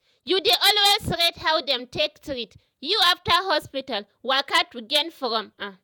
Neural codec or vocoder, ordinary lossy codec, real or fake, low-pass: vocoder, 48 kHz, 128 mel bands, Vocos; none; fake; none